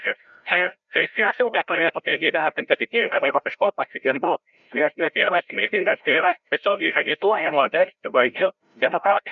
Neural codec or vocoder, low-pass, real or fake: codec, 16 kHz, 0.5 kbps, FreqCodec, larger model; 7.2 kHz; fake